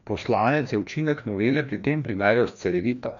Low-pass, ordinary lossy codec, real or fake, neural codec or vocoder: 7.2 kHz; none; fake; codec, 16 kHz, 1 kbps, FreqCodec, larger model